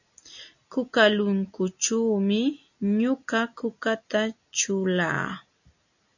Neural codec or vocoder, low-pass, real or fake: none; 7.2 kHz; real